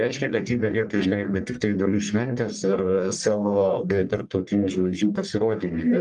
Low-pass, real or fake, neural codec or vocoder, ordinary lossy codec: 10.8 kHz; fake; codec, 44.1 kHz, 1.7 kbps, Pupu-Codec; Opus, 32 kbps